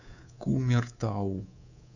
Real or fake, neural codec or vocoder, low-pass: fake; codec, 24 kHz, 3.1 kbps, DualCodec; 7.2 kHz